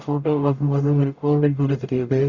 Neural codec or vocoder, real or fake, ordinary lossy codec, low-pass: codec, 44.1 kHz, 0.9 kbps, DAC; fake; Opus, 64 kbps; 7.2 kHz